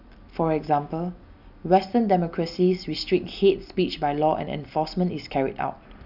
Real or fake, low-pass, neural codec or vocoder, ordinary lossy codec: real; 5.4 kHz; none; none